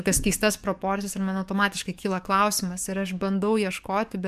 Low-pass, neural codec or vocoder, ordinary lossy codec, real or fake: 14.4 kHz; codec, 44.1 kHz, 7.8 kbps, DAC; MP3, 96 kbps; fake